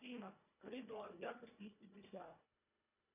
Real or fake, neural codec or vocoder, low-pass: fake; codec, 24 kHz, 1.5 kbps, HILCodec; 3.6 kHz